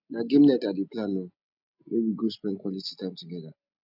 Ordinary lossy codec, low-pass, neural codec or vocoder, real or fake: none; 5.4 kHz; none; real